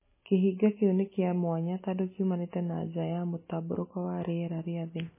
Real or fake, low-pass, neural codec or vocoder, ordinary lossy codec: real; 3.6 kHz; none; MP3, 16 kbps